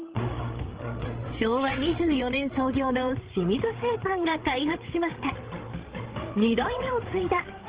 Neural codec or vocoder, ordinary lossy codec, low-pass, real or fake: codec, 16 kHz, 8 kbps, FreqCodec, larger model; Opus, 16 kbps; 3.6 kHz; fake